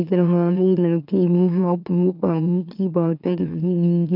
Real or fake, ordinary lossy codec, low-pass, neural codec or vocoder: fake; none; 5.4 kHz; autoencoder, 44.1 kHz, a latent of 192 numbers a frame, MeloTTS